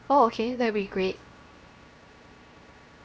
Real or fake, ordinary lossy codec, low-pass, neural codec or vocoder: fake; none; none; codec, 16 kHz, 0.7 kbps, FocalCodec